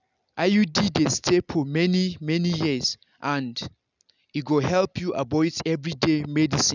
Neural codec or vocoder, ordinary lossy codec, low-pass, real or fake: none; none; 7.2 kHz; real